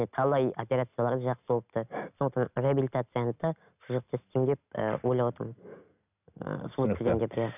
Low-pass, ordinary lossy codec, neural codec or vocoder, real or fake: 3.6 kHz; none; none; real